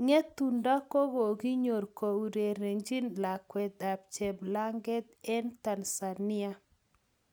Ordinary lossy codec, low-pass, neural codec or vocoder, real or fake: none; none; none; real